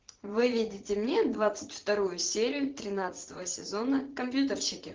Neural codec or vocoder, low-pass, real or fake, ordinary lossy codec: vocoder, 44.1 kHz, 80 mel bands, Vocos; 7.2 kHz; fake; Opus, 16 kbps